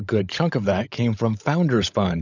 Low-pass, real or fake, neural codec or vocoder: 7.2 kHz; fake; codec, 16 kHz, 16 kbps, FunCodec, trained on LibriTTS, 50 frames a second